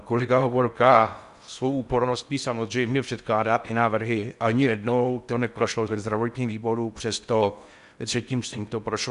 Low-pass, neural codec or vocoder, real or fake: 10.8 kHz; codec, 16 kHz in and 24 kHz out, 0.6 kbps, FocalCodec, streaming, 4096 codes; fake